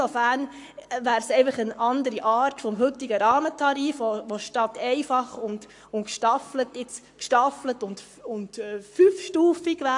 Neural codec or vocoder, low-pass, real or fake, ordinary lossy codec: codec, 44.1 kHz, 7.8 kbps, Pupu-Codec; 10.8 kHz; fake; AAC, 64 kbps